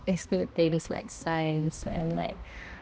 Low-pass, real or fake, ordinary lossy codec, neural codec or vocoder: none; fake; none; codec, 16 kHz, 1 kbps, X-Codec, HuBERT features, trained on balanced general audio